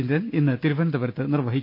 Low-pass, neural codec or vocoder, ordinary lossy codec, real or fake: 5.4 kHz; none; none; real